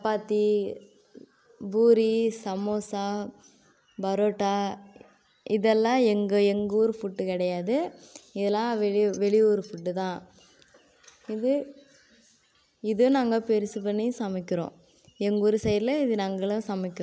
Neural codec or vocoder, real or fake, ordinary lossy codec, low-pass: none; real; none; none